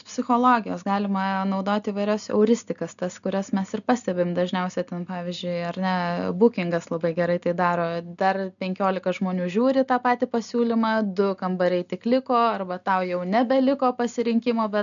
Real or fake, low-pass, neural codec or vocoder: real; 7.2 kHz; none